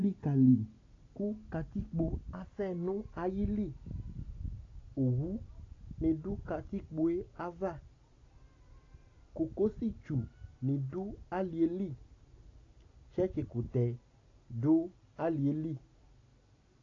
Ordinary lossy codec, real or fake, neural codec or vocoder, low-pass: AAC, 32 kbps; real; none; 7.2 kHz